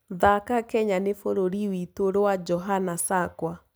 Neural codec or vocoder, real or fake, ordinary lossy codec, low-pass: none; real; none; none